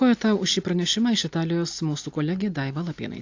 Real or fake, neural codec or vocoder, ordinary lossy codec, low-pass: real; none; AAC, 48 kbps; 7.2 kHz